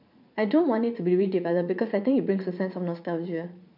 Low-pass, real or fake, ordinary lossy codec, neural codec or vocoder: 5.4 kHz; fake; none; vocoder, 44.1 kHz, 80 mel bands, Vocos